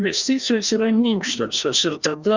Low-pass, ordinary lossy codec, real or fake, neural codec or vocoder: 7.2 kHz; Opus, 64 kbps; fake; codec, 16 kHz, 1 kbps, FreqCodec, larger model